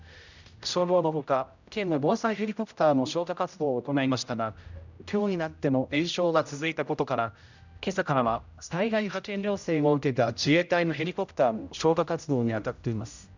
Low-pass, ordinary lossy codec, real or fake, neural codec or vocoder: 7.2 kHz; none; fake; codec, 16 kHz, 0.5 kbps, X-Codec, HuBERT features, trained on general audio